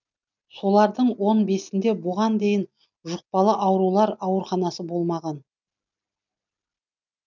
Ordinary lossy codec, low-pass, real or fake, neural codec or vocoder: none; 7.2 kHz; real; none